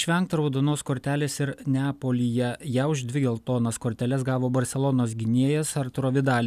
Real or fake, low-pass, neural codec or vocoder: real; 14.4 kHz; none